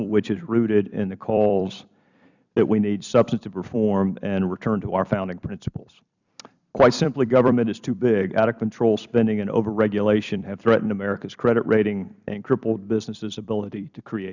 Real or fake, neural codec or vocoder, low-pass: fake; vocoder, 44.1 kHz, 128 mel bands every 256 samples, BigVGAN v2; 7.2 kHz